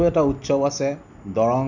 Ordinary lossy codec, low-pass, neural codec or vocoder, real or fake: none; 7.2 kHz; none; real